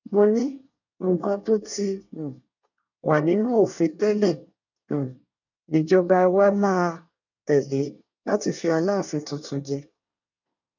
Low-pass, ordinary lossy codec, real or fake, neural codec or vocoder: 7.2 kHz; none; fake; codec, 24 kHz, 1 kbps, SNAC